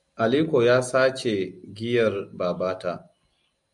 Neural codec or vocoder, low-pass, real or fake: none; 10.8 kHz; real